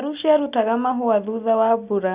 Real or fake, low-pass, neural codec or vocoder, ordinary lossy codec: real; 3.6 kHz; none; Opus, 64 kbps